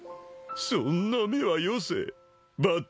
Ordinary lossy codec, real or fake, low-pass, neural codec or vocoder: none; real; none; none